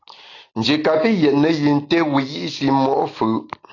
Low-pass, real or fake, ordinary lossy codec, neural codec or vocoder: 7.2 kHz; real; AAC, 32 kbps; none